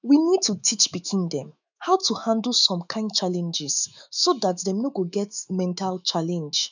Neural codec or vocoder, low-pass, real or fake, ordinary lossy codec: autoencoder, 48 kHz, 128 numbers a frame, DAC-VAE, trained on Japanese speech; 7.2 kHz; fake; none